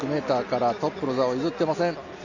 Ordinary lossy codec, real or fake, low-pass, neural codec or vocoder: none; real; 7.2 kHz; none